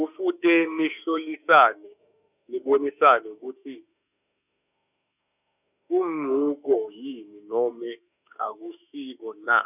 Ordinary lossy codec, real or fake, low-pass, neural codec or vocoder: none; fake; 3.6 kHz; autoencoder, 48 kHz, 32 numbers a frame, DAC-VAE, trained on Japanese speech